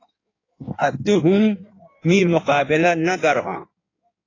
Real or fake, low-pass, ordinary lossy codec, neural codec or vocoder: fake; 7.2 kHz; AAC, 32 kbps; codec, 16 kHz in and 24 kHz out, 1.1 kbps, FireRedTTS-2 codec